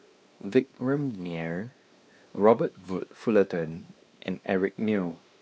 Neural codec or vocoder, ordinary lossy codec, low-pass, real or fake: codec, 16 kHz, 2 kbps, X-Codec, WavLM features, trained on Multilingual LibriSpeech; none; none; fake